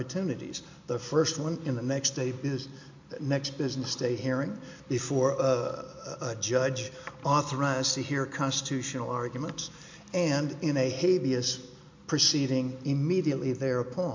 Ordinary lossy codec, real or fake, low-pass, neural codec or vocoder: MP3, 48 kbps; real; 7.2 kHz; none